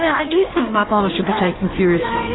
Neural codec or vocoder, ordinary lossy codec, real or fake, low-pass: codec, 16 kHz in and 24 kHz out, 1.1 kbps, FireRedTTS-2 codec; AAC, 16 kbps; fake; 7.2 kHz